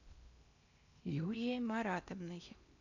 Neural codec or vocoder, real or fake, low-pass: codec, 16 kHz in and 24 kHz out, 0.8 kbps, FocalCodec, streaming, 65536 codes; fake; 7.2 kHz